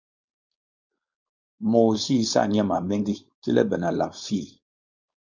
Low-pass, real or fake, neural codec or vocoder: 7.2 kHz; fake; codec, 16 kHz, 4.8 kbps, FACodec